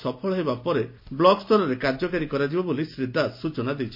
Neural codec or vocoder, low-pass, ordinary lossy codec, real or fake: none; 5.4 kHz; MP3, 32 kbps; real